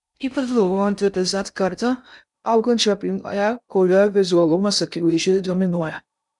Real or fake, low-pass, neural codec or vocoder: fake; 10.8 kHz; codec, 16 kHz in and 24 kHz out, 0.6 kbps, FocalCodec, streaming, 4096 codes